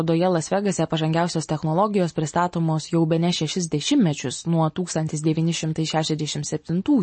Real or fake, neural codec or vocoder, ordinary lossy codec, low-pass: real; none; MP3, 32 kbps; 9.9 kHz